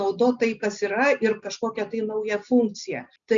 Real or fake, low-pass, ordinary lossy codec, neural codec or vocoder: real; 7.2 kHz; Opus, 64 kbps; none